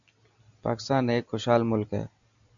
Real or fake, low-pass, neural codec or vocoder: real; 7.2 kHz; none